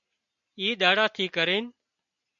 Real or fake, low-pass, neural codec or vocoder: real; 7.2 kHz; none